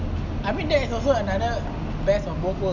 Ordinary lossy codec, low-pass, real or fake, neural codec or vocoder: none; 7.2 kHz; real; none